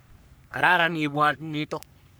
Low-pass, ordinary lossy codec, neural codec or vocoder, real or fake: none; none; codec, 44.1 kHz, 3.4 kbps, Pupu-Codec; fake